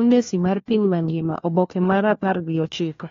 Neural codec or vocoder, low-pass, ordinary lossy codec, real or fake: codec, 16 kHz, 1 kbps, FunCodec, trained on LibriTTS, 50 frames a second; 7.2 kHz; AAC, 32 kbps; fake